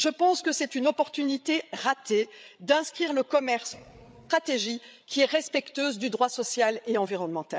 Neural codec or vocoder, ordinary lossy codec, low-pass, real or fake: codec, 16 kHz, 8 kbps, FreqCodec, larger model; none; none; fake